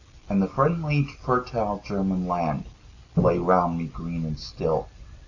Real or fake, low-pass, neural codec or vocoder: real; 7.2 kHz; none